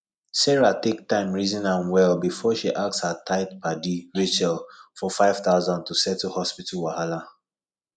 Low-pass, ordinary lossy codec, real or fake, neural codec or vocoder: 9.9 kHz; MP3, 96 kbps; real; none